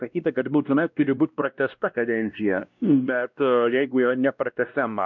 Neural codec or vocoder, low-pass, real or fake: codec, 16 kHz, 1 kbps, X-Codec, WavLM features, trained on Multilingual LibriSpeech; 7.2 kHz; fake